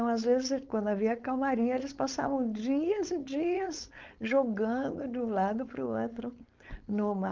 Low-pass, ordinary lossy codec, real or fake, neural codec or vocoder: 7.2 kHz; Opus, 24 kbps; fake; codec, 16 kHz, 4.8 kbps, FACodec